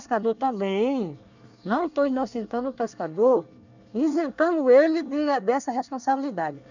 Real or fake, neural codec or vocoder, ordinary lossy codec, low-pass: fake; codec, 24 kHz, 1 kbps, SNAC; none; 7.2 kHz